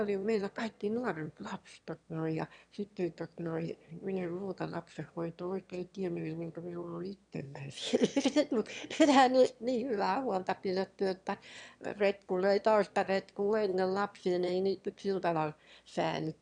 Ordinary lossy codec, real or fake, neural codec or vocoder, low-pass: none; fake; autoencoder, 22.05 kHz, a latent of 192 numbers a frame, VITS, trained on one speaker; 9.9 kHz